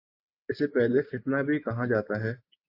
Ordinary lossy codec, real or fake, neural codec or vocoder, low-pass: AAC, 48 kbps; real; none; 5.4 kHz